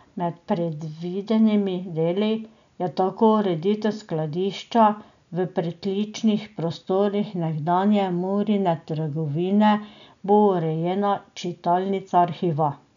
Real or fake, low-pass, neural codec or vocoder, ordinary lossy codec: real; 7.2 kHz; none; none